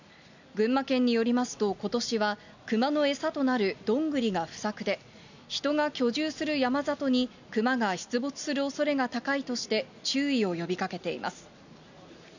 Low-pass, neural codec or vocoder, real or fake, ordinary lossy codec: 7.2 kHz; none; real; none